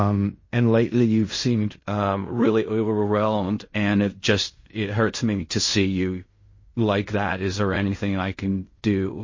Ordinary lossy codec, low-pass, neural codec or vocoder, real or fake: MP3, 32 kbps; 7.2 kHz; codec, 16 kHz in and 24 kHz out, 0.4 kbps, LongCat-Audio-Codec, fine tuned four codebook decoder; fake